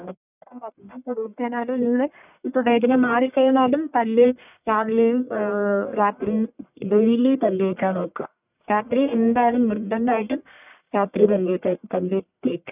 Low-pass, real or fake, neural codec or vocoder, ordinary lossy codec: 3.6 kHz; fake; codec, 44.1 kHz, 1.7 kbps, Pupu-Codec; none